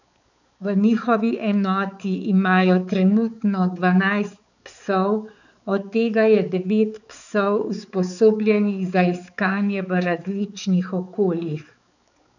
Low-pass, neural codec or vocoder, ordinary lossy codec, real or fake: 7.2 kHz; codec, 16 kHz, 4 kbps, X-Codec, HuBERT features, trained on balanced general audio; none; fake